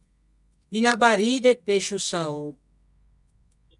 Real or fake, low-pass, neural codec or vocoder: fake; 10.8 kHz; codec, 24 kHz, 0.9 kbps, WavTokenizer, medium music audio release